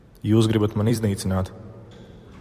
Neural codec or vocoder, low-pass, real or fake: vocoder, 44.1 kHz, 128 mel bands every 256 samples, BigVGAN v2; 14.4 kHz; fake